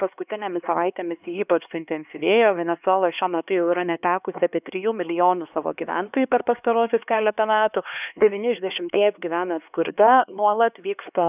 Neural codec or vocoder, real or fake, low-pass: codec, 16 kHz, 2 kbps, X-Codec, HuBERT features, trained on LibriSpeech; fake; 3.6 kHz